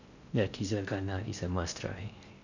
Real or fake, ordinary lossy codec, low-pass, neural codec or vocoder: fake; none; 7.2 kHz; codec, 16 kHz in and 24 kHz out, 0.6 kbps, FocalCodec, streaming, 4096 codes